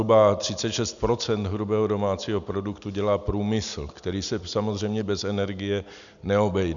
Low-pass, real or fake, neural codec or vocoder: 7.2 kHz; real; none